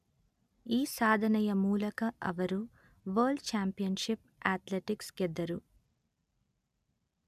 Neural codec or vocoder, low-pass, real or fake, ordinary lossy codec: none; 14.4 kHz; real; none